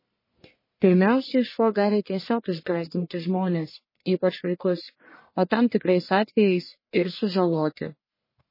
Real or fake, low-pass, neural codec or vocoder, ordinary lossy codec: fake; 5.4 kHz; codec, 44.1 kHz, 1.7 kbps, Pupu-Codec; MP3, 24 kbps